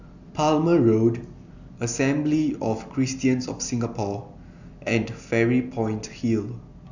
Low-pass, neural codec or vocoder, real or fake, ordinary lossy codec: 7.2 kHz; none; real; none